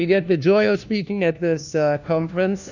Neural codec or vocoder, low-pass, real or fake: codec, 16 kHz, 1 kbps, FunCodec, trained on LibriTTS, 50 frames a second; 7.2 kHz; fake